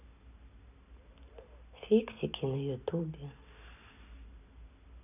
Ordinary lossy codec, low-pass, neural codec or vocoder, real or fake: none; 3.6 kHz; none; real